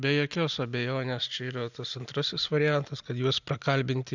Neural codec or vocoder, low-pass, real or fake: none; 7.2 kHz; real